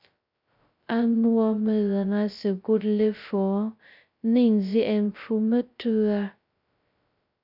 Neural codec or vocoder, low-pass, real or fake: codec, 16 kHz, 0.2 kbps, FocalCodec; 5.4 kHz; fake